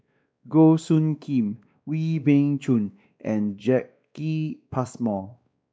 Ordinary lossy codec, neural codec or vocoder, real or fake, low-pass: none; codec, 16 kHz, 2 kbps, X-Codec, WavLM features, trained on Multilingual LibriSpeech; fake; none